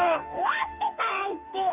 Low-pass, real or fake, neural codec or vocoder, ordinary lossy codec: 3.6 kHz; fake; codec, 44.1 kHz, 2.6 kbps, DAC; none